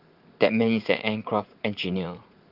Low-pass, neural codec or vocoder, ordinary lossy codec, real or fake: 5.4 kHz; vocoder, 44.1 kHz, 80 mel bands, Vocos; Opus, 24 kbps; fake